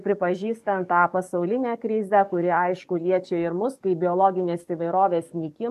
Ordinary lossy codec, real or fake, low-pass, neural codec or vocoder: AAC, 96 kbps; fake; 14.4 kHz; codec, 44.1 kHz, 7.8 kbps, DAC